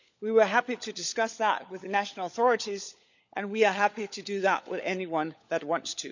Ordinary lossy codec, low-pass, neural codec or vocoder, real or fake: none; 7.2 kHz; codec, 16 kHz, 16 kbps, FunCodec, trained on LibriTTS, 50 frames a second; fake